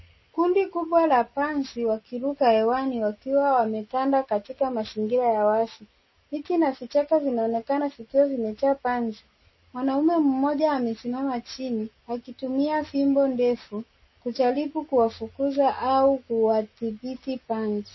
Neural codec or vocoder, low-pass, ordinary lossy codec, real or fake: none; 7.2 kHz; MP3, 24 kbps; real